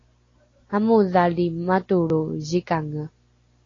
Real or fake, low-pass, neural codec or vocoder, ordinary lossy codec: real; 7.2 kHz; none; AAC, 32 kbps